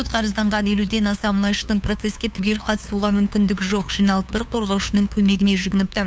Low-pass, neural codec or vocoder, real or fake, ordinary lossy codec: none; codec, 16 kHz, 2 kbps, FunCodec, trained on LibriTTS, 25 frames a second; fake; none